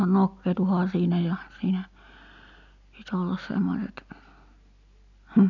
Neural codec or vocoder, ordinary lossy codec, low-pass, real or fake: none; none; 7.2 kHz; real